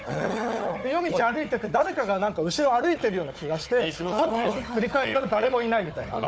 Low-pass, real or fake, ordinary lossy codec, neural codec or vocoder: none; fake; none; codec, 16 kHz, 4 kbps, FunCodec, trained on Chinese and English, 50 frames a second